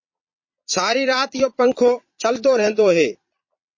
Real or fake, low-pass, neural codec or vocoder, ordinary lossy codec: fake; 7.2 kHz; vocoder, 22.05 kHz, 80 mel bands, Vocos; MP3, 32 kbps